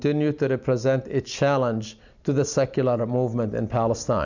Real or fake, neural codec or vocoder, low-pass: real; none; 7.2 kHz